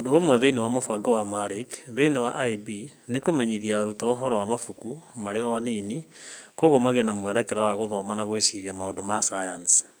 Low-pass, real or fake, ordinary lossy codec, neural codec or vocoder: none; fake; none; codec, 44.1 kHz, 2.6 kbps, SNAC